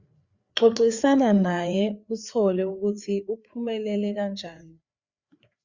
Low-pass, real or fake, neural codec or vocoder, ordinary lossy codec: 7.2 kHz; fake; codec, 16 kHz, 4 kbps, FreqCodec, larger model; Opus, 64 kbps